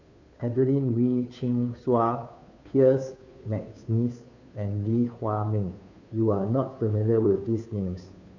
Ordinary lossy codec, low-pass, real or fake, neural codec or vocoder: none; 7.2 kHz; fake; codec, 16 kHz, 2 kbps, FunCodec, trained on Chinese and English, 25 frames a second